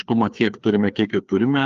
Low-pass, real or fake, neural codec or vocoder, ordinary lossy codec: 7.2 kHz; fake; codec, 16 kHz, 2 kbps, FreqCodec, larger model; Opus, 32 kbps